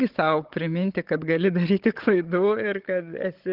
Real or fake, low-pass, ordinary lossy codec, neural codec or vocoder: fake; 5.4 kHz; Opus, 16 kbps; codec, 16 kHz, 16 kbps, FunCodec, trained on Chinese and English, 50 frames a second